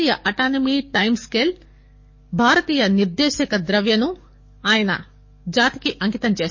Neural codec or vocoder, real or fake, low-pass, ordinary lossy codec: codec, 44.1 kHz, 7.8 kbps, DAC; fake; 7.2 kHz; MP3, 32 kbps